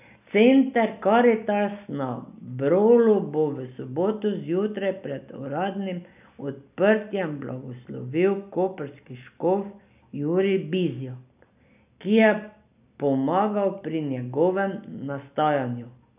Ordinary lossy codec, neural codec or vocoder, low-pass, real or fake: none; none; 3.6 kHz; real